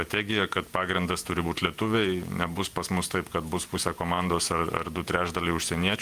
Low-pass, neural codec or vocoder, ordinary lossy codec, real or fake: 14.4 kHz; vocoder, 48 kHz, 128 mel bands, Vocos; Opus, 32 kbps; fake